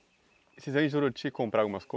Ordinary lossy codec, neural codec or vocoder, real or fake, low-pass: none; none; real; none